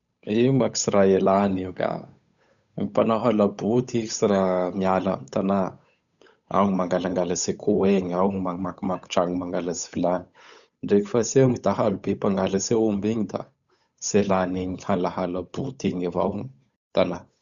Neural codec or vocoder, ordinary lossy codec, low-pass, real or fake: codec, 16 kHz, 8 kbps, FunCodec, trained on Chinese and English, 25 frames a second; none; 7.2 kHz; fake